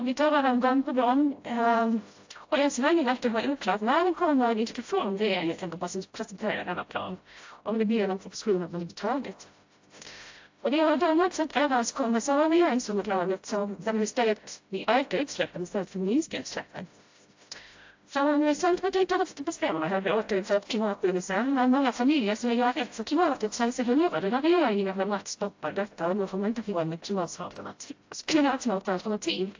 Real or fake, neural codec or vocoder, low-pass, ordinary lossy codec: fake; codec, 16 kHz, 0.5 kbps, FreqCodec, smaller model; 7.2 kHz; AAC, 48 kbps